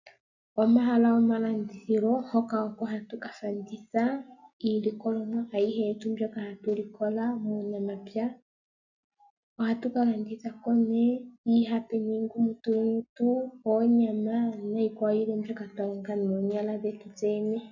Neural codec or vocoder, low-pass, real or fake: none; 7.2 kHz; real